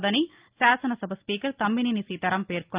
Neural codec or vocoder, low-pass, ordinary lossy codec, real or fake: none; 3.6 kHz; Opus, 24 kbps; real